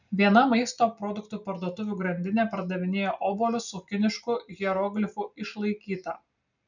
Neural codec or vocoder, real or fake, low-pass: none; real; 7.2 kHz